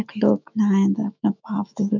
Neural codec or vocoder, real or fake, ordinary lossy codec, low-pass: autoencoder, 48 kHz, 128 numbers a frame, DAC-VAE, trained on Japanese speech; fake; none; 7.2 kHz